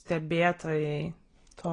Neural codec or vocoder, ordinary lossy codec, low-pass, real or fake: none; AAC, 32 kbps; 9.9 kHz; real